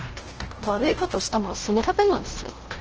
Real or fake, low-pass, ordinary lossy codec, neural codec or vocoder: fake; 7.2 kHz; Opus, 16 kbps; codec, 16 kHz, 0.5 kbps, FunCodec, trained on LibriTTS, 25 frames a second